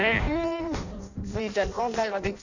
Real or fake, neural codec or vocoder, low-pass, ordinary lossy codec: fake; codec, 16 kHz in and 24 kHz out, 0.6 kbps, FireRedTTS-2 codec; 7.2 kHz; none